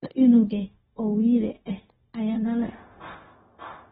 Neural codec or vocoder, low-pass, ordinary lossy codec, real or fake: codec, 16 kHz, 0.4 kbps, LongCat-Audio-Codec; 7.2 kHz; AAC, 16 kbps; fake